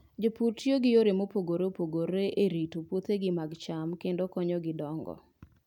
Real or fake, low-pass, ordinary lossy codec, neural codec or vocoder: real; 19.8 kHz; none; none